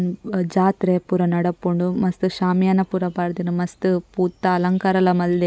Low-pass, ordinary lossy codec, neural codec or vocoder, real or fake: none; none; none; real